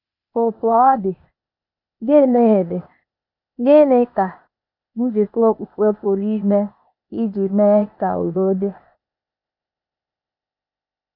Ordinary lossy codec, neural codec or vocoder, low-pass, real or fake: none; codec, 16 kHz, 0.8 kbps, ZipCodec; 5.4 kHz; fake